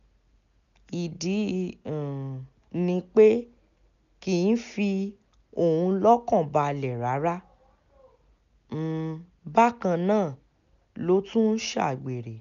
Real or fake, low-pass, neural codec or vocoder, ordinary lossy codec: real; 7.2 kHz; none; none